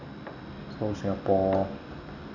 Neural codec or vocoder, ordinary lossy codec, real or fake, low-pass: none; none; real; 7.2 kHz